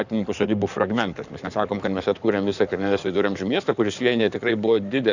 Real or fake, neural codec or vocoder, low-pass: fake; codec, 16 kHz in and 24 kHz out, 2.2 kbps, FireRedTTS-2 codec; 7.2 kHz